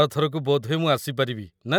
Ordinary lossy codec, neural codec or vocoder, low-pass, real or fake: none; none; 19.8 kHz; real